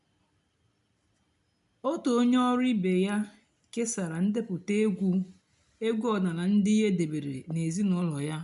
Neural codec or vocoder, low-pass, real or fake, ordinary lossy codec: none; 10.8 kHz; real; none